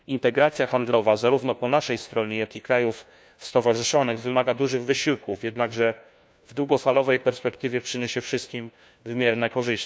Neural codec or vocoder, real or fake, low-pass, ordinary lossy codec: codec, 16 kHz, 1 kbps, FunCodec, trained on LibriTTS, 50 frames a second; fake; none; none